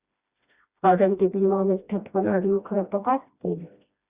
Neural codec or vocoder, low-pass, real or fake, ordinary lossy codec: codec, 16 kHz, 1 kbps, FreqCodec, smaller model; 3.6 kHz; fake; Opus, 64 kbps